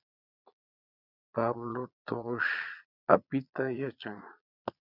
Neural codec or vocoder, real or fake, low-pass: vocoder, 22.05 kHz, 80 mel bands, Vocos; fake; 5.4 kHz